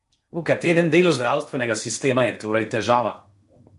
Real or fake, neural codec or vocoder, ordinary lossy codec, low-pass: fake; codec, 16 kHz in and 24 kHz out, 0.8 kbps, FocalCodec, streaming, 65536 codes; MP3, 64 kbps; 10.8 kHz